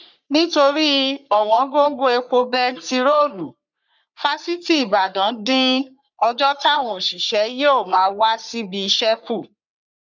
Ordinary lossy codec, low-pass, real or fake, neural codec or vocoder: none; 7.2 kHz; fake; codec, 44.1 kHz, 3.4 kbps, Pupu-Codec